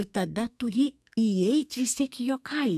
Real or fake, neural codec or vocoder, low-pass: fake; codec, 32 kHz, 1.9 kbps, SNAC; 14.4 kHz